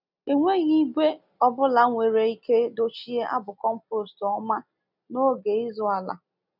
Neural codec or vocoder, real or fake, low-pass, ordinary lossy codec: none; real; 5.4 kHz; none